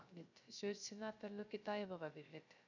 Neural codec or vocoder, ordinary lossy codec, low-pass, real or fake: codec, 16 kHz, 0.3 kbps, FocalCodec; none; 7.2 kHz; fake